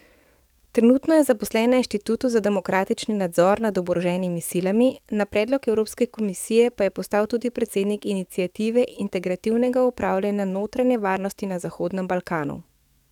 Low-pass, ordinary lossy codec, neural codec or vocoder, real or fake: 19.8 kHz; none; codec, 44.1 kHz, 7.8 kbps, DAC; fake